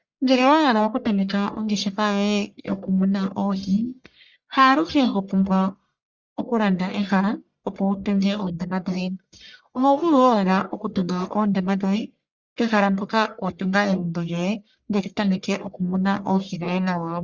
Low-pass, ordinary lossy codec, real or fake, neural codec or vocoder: 7.2 kHz; Opus, 64 kbps; fake; codec, 44.1 kHz, 1.7 kbps, Pupu-Codec